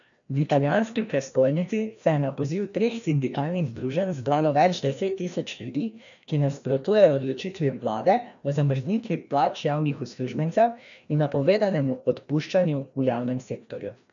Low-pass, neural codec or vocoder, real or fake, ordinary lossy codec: 7.2 kHz; codec, 16 kHz, 1 kbps, FreqCodec, larger model; fake; none